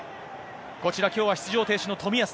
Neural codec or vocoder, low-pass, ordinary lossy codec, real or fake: none; none; none; real